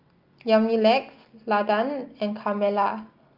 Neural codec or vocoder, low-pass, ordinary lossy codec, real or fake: none; 5.4 kHz; Opus, 24 kbps; real